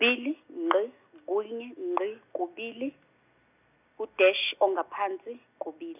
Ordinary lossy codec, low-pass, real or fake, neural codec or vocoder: none; 3.6 kHz; real; none